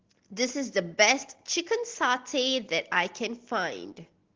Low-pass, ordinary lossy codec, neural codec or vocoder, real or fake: 7.2 kHz; Opus, 16 kbps; none; real